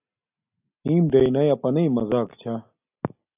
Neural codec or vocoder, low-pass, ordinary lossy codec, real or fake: none; 3.6 kHz; AAC, 32 kbps; real